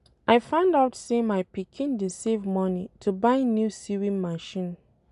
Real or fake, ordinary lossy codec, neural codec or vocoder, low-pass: real; none; none; 10.8 kHz